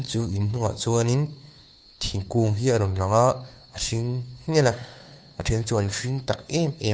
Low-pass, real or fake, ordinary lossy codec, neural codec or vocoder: none; fake; none; codec, 16 kHz, 2 kbps, FunCodec, trained on Chinese and English, 25 frames a second